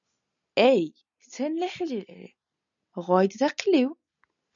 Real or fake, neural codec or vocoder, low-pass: real; none; 7.2 kHz